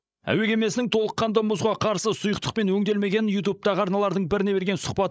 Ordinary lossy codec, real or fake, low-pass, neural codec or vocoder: none; fake; none; codec, 16 kHz, 16 kbps, FreqCodec, larger model